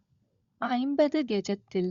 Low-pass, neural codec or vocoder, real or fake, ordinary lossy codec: 7.2 kHz; codec, 16 kHz, 16 kbps, FunCodec, trained on LibriTTS, 50 frames a second; fake; Opus, 64 kbps